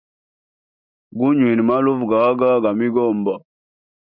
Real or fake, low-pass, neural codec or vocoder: real; 5.4 kHz; none